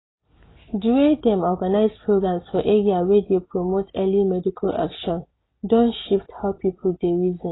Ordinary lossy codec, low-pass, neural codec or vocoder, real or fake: AAC, 16 kbps; 7.2 kHz; none; real